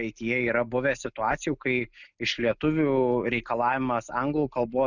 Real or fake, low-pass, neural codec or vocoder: real; 7.2 kHz; none